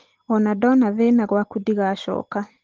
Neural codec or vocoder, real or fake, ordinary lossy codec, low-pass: none; real; Opus, 16 kbps; 7.2 kHz